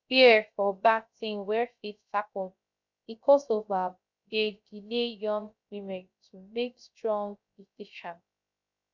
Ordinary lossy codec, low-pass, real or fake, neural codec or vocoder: none; 7.2 kHz; fake; codec, 16 kHz, 0.3 kbps, FocalCodec